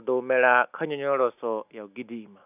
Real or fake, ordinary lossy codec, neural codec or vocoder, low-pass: real; none; none; 3.6 kHz